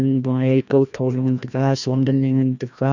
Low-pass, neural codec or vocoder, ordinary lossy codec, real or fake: 7.2 kHz; codec, 16 kHz, 1 kbps, FreqCodec, larger model; none; fake